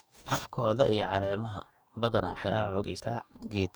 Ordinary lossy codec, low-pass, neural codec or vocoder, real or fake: none; none; codec, 44.1 kHz, 2.6 kbps, DAC; fake